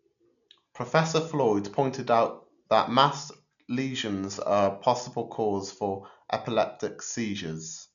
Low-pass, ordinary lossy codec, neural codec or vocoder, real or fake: 7.2 kHz; none; none; real